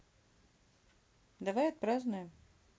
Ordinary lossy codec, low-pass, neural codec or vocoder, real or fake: none; none; none; real